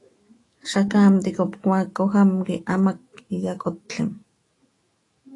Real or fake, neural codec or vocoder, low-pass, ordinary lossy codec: fake; autoencoder, 48 kHz, 128 numbers a frame, DAC-VAE, trained on Japanese speech; 10.8 kHz; AAC, 32 kbps